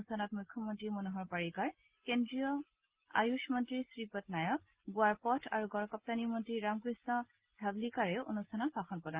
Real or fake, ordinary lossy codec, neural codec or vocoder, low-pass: real; Opus, 16 kbps; none; 3.6 kHz